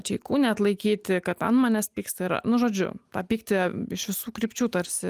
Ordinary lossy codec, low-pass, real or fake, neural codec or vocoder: Opus, 32 kbps; 14.4 kHz; real; none